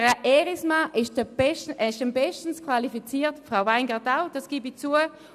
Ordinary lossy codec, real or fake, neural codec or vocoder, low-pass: none; real; none; 14.4 kHz